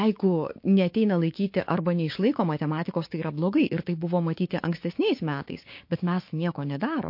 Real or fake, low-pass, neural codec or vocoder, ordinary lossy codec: fake; 5.4 kHz; codec, 16 kHz, 6 kbps, DAC; MP3, 32 kbps